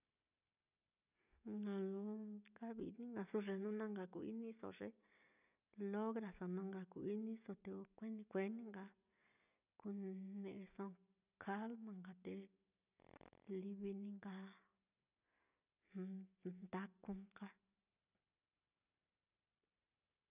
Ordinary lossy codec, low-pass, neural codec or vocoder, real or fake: none; 3.6 kHz; none; real